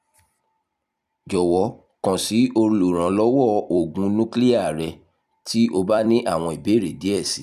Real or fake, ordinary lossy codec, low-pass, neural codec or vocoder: fake; none; 14.4 kHz; vocoder, 44.1 kHz, 128 mel bands every 256 samples, BigVGAN v2